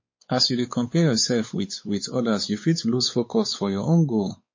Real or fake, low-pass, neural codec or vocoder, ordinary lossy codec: fake; 7.2 kHz; codec, 16 kHz, 4 kbps, X-Codec, WavLM features, trained on Multilingual LibriSpeech; MP3, 32 kbps